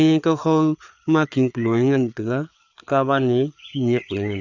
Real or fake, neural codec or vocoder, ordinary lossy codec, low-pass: fake; codec, 16 kHz, 4 kbps, FreqCodec, larger model; none; 7.2 kHz